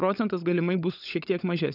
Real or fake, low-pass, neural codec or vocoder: fake; 5.4 kHz; codec, 16 kHz, 16 kbps, FunCodec, trained on LibriTTS, 50 frames a second